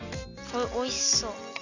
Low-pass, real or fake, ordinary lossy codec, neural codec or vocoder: 7.2 kHz; real; none; none